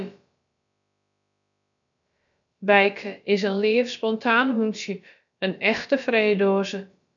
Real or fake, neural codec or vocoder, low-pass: fake; codec, 16 kHz, about 1 kbps, DyCAST, with the encoder's durations; 7.2 kHz